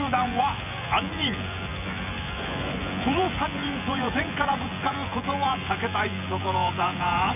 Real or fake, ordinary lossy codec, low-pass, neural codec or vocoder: fake; none; 3.6 kHz; vocoder, 24 kHz, 100 mel bands, Vocos